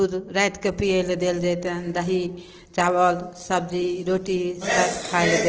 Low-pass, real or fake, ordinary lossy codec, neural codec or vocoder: 7.2 kHz; real; Opus, 16 kbps; none